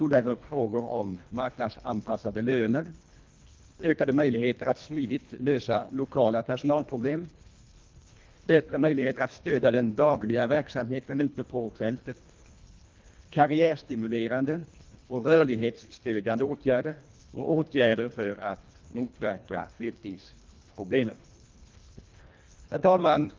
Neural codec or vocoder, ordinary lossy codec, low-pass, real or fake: codec, 24 kHz, 1.5 kbps, HILCodec; Opus, 32 kbps; 7.2 kHz; fake